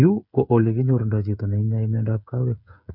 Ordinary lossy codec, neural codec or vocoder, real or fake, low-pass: none; codec, 16 kHz, 8 kbps, FreqCodec, smaller model; fake; 5.4 kHz